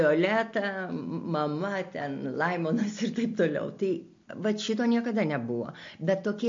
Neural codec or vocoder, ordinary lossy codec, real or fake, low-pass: none; MP3, 48 kbps; real; 7.2 kHz